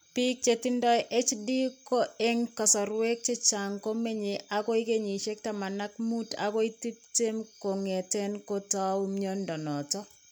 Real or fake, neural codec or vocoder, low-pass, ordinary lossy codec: real; none; none; none